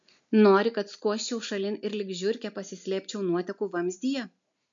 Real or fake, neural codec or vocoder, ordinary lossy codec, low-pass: real; none; MP3, 64 kbps; 7.2 kHz